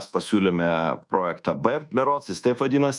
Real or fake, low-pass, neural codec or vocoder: fake; 10.8 kHz; codec, 24 kHz, 1.2 kbps, DualCodec